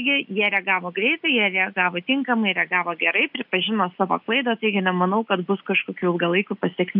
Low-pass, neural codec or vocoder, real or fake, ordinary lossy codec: 5.4 kHz; none; real; AAC, 48 kbps